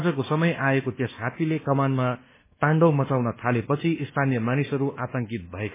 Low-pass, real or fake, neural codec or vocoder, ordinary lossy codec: 3.6 kHz; fake; codec, 24 kHz, 1.2 kbps, DualCodec; MP3, 16 kbps